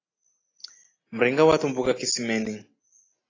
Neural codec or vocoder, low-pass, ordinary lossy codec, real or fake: none; 7.2 kHz; AAC, 32 kbps; real